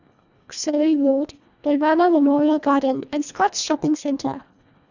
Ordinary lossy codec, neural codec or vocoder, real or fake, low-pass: none; codec, 24 kHz, 1.5 kbps, HILCodec; fake; 7.2 kHz